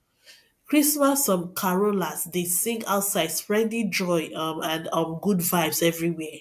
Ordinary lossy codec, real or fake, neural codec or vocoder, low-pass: AAC, 96 kbps; real; none; 14.4 kHz